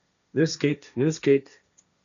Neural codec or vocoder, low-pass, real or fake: codec, 16 kHz, 1.1 kbps, Voila-Tokenizer; 7.2 kHz; fake